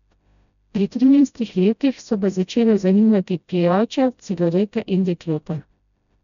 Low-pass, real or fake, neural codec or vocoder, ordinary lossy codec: 7.2 kHz; fake; codec, 16 kHz, 0.5 kbps, FreqCodec, smaller model; none